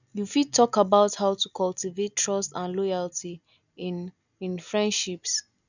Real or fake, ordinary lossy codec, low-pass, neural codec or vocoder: real; none; 7.2 kHz; none